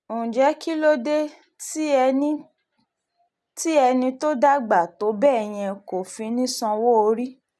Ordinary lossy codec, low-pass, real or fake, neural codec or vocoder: none; none; real; none